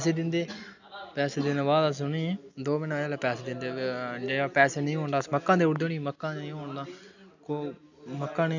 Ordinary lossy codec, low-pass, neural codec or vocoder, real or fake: none; 7.2 kHz; none; real